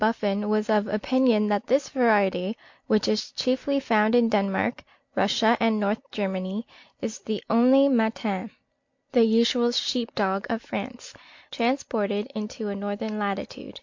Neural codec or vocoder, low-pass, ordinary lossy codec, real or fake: none; 7.2 kHz; MP3, 48 kbps; real